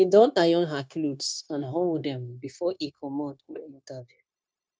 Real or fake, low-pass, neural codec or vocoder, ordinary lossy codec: fake; none; codec, 16 kHz, 0.9 kbps, LongCat-Audio-Codec; none